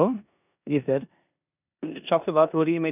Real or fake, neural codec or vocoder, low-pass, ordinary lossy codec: fake; codec, 16 kHz in and 24 kHz out, 0.9 kbps, LongCat-Audio-Codec, four codebook decoder; 3.6 kHz; none